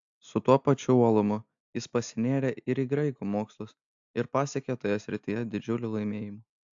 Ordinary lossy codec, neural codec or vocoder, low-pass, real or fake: AAC, 64 kbps; none; 7.2 kHz; real